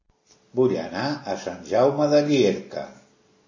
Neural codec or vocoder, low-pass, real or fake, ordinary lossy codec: none; 7.2 kHz; real; MP3, 32 kbps